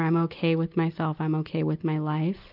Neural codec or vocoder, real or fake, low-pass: none; real; 5.4 kHz